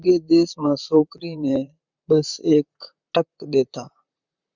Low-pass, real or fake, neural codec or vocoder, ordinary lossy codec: 7.2 kHz; fake; vocoder, 44.1 kHz, 128 mel bands, Pupu-Vocoder; Opus, 64 kbps